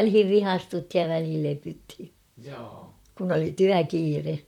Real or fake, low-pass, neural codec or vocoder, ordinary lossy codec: fake; 19.8 kHz; vocoder, 44.1 kHz, 128 mel bands, Pupu-Vocoder; none